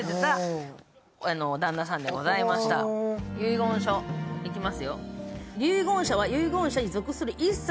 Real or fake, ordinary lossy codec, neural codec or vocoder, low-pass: real; none; none; none